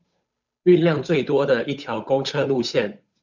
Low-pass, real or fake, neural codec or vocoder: 7.2 kHz; fake; codec, 16 kHz, 8 kbps, FunCodec, trained on Chinese and English, 25 frames a second